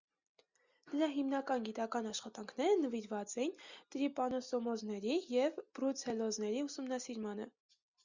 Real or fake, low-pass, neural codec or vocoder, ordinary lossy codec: real; 7.2 kHz; none; Opus, 64 kbps